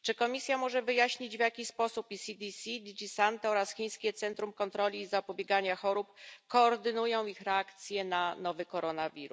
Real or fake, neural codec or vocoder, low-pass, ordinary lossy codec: real; none; none; none